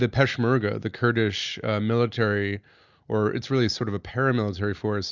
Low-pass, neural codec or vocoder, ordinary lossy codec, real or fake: 7.2 kHz; none; Opus, 64 kbps; real